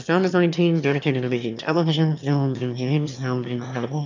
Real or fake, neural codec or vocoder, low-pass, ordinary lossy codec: fake; autoencoder, 22.05 kHz, a latent of 192 numbers a frame, VITS, trained on one speaker; 7.2 kHz; MP3, 64 kbps